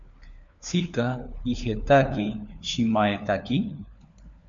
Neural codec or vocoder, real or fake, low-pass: codec, 16 kHz, 4 kbps, FunCodec, trained on LibriTTS, 50 frames a second; fake; 7.2 kHz